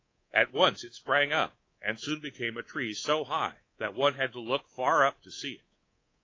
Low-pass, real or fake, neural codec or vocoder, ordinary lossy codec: 7.2 kHz; fake; codec, 24 kHz, 3.1 kbps, DualCodec; AAC, 32 kbps